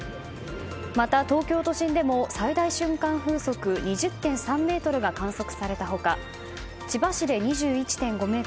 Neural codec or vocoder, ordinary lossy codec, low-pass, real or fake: none; none; none; real